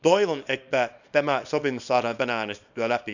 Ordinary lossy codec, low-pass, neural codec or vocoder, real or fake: none; 7.2 kHz; codec, 24 kHz, 0.9 kbps, WavTokenizer, small release; fake